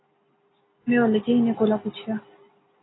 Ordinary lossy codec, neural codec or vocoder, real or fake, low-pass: AAC, 16 kbps; none; real; 7.2 kHz